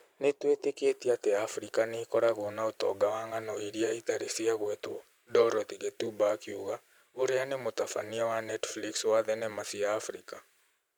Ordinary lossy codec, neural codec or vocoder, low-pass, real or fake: none; vocoder, 44.1 kHz, 128 mel bands every 512 samples, BigVGAN v2; none; fake